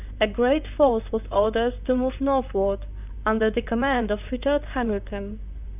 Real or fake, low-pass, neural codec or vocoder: fake; 3.6 kHz; vocoder, 44.1 kHz, 128 mel bands, Pupu-Vocoder